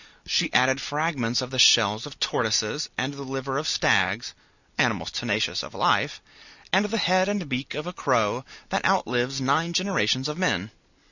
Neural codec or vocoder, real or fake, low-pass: none; real; 7.2 kHz